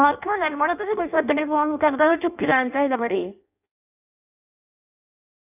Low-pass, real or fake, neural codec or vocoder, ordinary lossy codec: 3.6 kHz; fake; codec, 16 kHz in and 24 kHz out, 0.6 kbps, FireRedTTS-2 codec; none